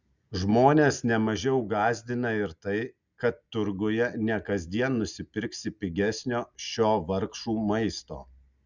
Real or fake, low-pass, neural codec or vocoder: real; 7.2 kHz; none